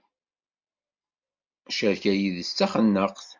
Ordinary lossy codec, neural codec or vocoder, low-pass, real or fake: MP3, 64 kbps; none; 7.2 kHz; real